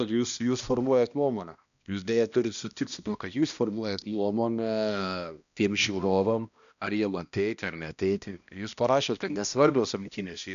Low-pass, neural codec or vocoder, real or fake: 7.2 kHz; codec, 16 kHz, 1 kbps, X-Codec, HuBERT features, trained on balanced general audio; fake